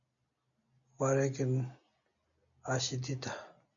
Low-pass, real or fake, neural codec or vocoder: 7.2 kHz; real; none